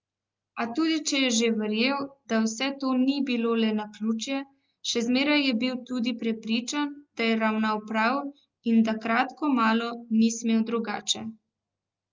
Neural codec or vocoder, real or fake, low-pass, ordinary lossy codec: none; real; 7.2 kHz; Opus, 24 kbps